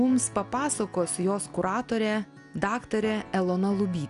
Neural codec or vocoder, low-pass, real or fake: none; 10.8 kHz; real